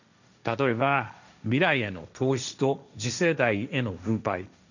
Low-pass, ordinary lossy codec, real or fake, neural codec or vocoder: 7.2 kHz; none; fake; codec, 16 kHz, 1.1 kbps, Voila-Tokenizer